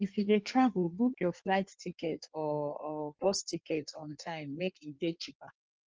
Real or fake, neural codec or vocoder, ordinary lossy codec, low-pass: fake; codec, 16 kHz in and 24 kHz out, 1.1 kbps, FireRedTTS-2 codec; Opus, 24 kbps; 7.2 kHz